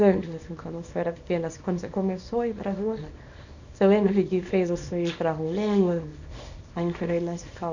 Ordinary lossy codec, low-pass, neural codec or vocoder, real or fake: none; 7.2 kHz; codec, 24 kHz, 0.9 kbps, WavTokenizer, small release; fake